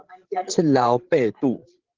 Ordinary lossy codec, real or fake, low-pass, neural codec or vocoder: Opus, 16 kbps; fake; 7.2 kHz; codec, 16 kHz, 16 kbps, FreqCodec, larger model